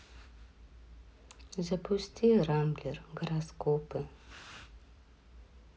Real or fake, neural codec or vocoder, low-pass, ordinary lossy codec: real; none; none; none